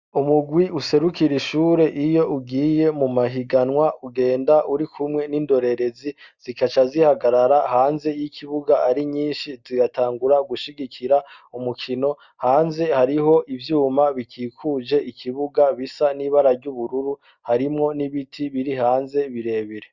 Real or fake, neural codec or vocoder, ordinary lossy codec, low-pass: real; none; Opus, 64 kbps; 7.2 kHz